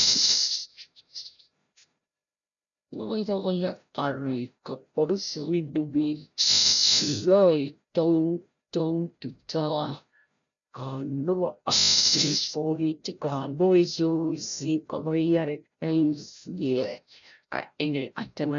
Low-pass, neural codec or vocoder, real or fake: 7.2 kHz; codec, 16 kHz, 0.5 kbps, FreqCodec, larger model; fake